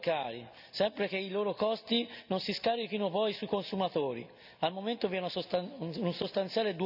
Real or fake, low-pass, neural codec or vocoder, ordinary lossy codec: real; 5.4 kHz; none; none